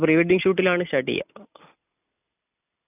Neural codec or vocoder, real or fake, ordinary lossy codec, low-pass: none; real; none; 3.6 kHz